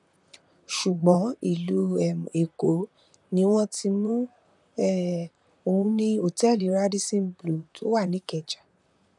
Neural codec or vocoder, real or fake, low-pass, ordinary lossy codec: vocoder, 44.1 kHz, 128 mel bands, Pupu-Vocoder; fake; 10.8 kHz; none